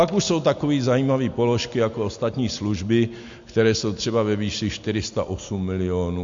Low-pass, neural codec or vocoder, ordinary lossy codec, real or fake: 7.2 kHz; none; MP3, 48 kbps; real